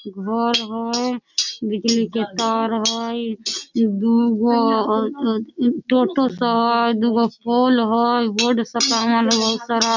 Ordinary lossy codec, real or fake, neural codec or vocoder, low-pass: none; real; none; 7.2 kHz